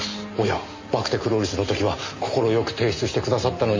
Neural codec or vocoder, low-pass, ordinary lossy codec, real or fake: none; 7.2 kHz; MP3, 32 kbps; real